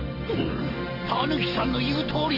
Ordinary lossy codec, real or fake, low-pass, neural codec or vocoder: Opus, 32 kbps; real; 5.4 kHz; none